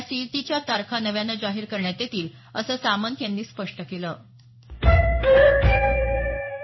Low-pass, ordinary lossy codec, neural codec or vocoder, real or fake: 7.2 kHz; MP3, 24 kbps; codec, 16 kHz in and 24 kHz out, 1 kbps, XY-Tokenizer; fake